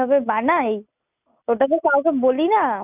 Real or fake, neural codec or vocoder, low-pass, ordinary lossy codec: real; none; 3.6 kHz; none